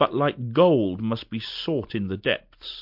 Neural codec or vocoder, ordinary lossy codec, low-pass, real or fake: none; MP3, 48 kbps; 5.4 kHz; real